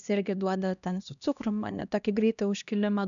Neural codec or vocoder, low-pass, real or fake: codec, 16 kHz, 1 kbps, X-Codec, HuBERT features, trained on LibriSpeech; 7.2 kHz; fake